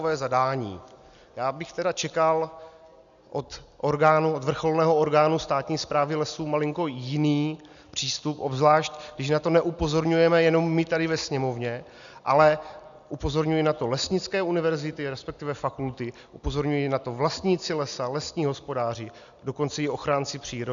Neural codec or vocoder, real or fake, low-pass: none; real; 7.2 kHz